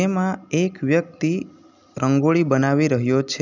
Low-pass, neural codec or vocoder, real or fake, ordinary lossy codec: 7.2 kHz; none; real; none